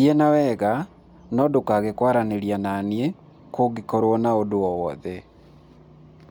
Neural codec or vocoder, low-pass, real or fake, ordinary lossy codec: none; 19.8 kHz; real; MP3, 96 kbps